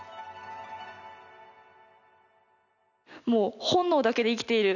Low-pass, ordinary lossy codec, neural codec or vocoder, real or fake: 7.2 kHz; none; none; real